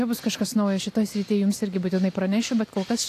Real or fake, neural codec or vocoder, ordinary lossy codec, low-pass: real; none; AAC, 64 kbps; 14.4 kHz